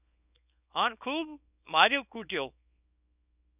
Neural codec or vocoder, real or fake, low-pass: codec, 24 kHz, 0.9 kbps, WavTokenizer, small release; fake; 3.6 kHz